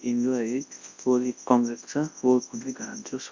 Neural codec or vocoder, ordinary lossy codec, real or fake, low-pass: codec, 24 kHz, 0.9 kbps, WavTokenizer, large speech release; MP3, 64 kbps; fake; 7.2 kHz